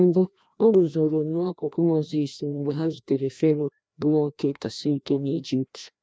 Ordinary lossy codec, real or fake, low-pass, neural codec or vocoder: none; fake; none; codec, 16 kHz, 1 kbps, FreqCodec, larger model